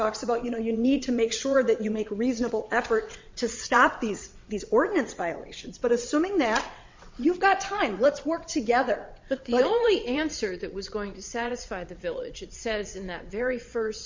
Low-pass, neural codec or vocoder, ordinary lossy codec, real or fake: 7.2 kHz; vocoder, 22.05 kHz, 80 mel bands, WaveNeXt; MP3, 48 kbps; fake